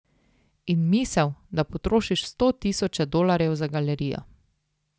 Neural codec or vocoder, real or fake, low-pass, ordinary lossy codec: none; real; none; none